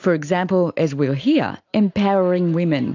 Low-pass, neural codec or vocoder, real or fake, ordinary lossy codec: 7.2 kHz; none; real; AAC, 48 kbps